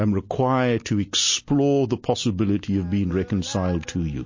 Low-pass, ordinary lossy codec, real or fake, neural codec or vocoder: 7.2 kHz; MP3, 32 kbps; real; none